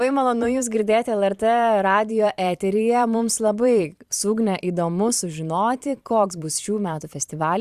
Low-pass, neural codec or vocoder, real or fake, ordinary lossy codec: 14.4 kHz; vocoder, 44.1 kHz, 128 mel bands every 512 samples, BigVGAN v2; fake; Opus, 64 kbps